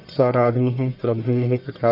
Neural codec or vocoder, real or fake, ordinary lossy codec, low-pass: codec, 44.1 kHz, 1.7 kbps, Pupu-Codec; fake; none; 5.4 kHz